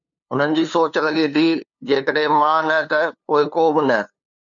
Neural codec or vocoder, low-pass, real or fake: codec, 16 kHz, 2 kbps, FunCodec, trained on LibriTTS, 25 frames a second; 7.2 kHz; fake